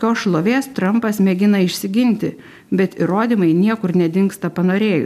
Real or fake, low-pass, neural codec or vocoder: real; 14.4 kHz; none